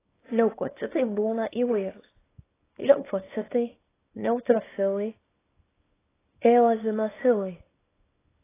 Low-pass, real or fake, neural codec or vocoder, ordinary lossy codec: 3.6 kHz; fake; codec, 24 kHz, 0.9 kbps, WavTokenizer, small release; AAC, 16 kbps